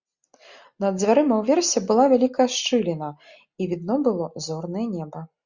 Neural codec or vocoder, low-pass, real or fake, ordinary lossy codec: none; 7.2 kHz; real; Opus, 64 kbps